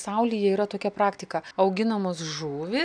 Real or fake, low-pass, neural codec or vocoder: real; 9.9 kHz; none